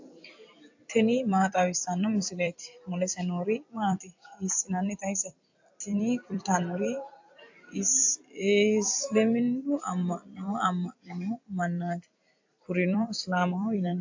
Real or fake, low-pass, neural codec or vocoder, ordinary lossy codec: fake; 7.2 kHz; vocoder, 44.1 kHz, 128 mel bands every 256 samples, BigVGAN v2; AAC, 48 kbps